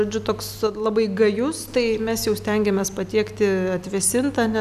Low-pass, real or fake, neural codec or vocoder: 14.4 kHz; real; none